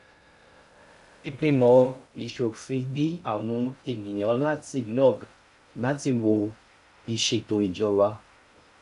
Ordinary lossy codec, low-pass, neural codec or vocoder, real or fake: none; 10.8 kHz; codec, 16 kHz in and 24 kHz out, 0.6 kbps, FocalCodec, streaming, 2048 codes; fake